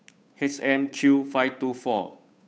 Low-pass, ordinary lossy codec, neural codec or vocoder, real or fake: none; none; codec, 16 kHz, 8 kbps, FunCodec, trained on Chinese and English, 25 frames a second; fake